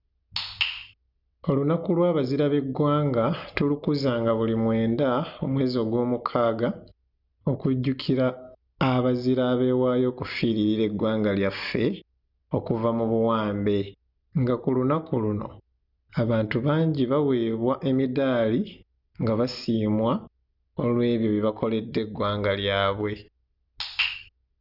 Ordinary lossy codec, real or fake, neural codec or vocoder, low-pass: none; real; none; 5.4 kHz